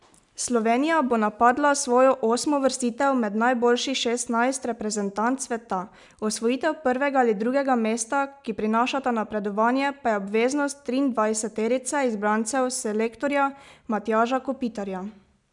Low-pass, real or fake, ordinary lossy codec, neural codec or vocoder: 10.8 kHz; real; none; none